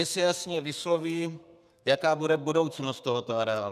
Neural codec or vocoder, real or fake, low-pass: codec, 32 kHz, 1.9 kbps, SNAC; fake; 14.4 kHz